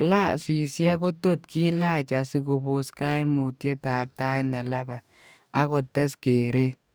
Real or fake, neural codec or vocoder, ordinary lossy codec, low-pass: fake; codec, 44.1 kHz, 2.6 kbps, DAC; none; none